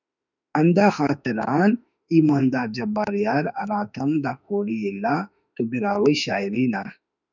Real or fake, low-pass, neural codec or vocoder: fake; 7.2 kHz; autoencoder, 48 kHz, 32 numbers a frame, DAC-VAE, trained on Japanese speech